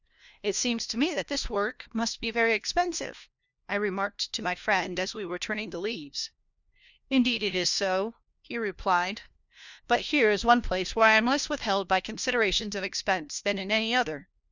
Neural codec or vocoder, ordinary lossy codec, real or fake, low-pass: codec, 16 kHz, 1 kbps, FunCodec, trained on LibriTTS, 50 frames a second; Opus, 64 kbps; fake; 7.2 kHz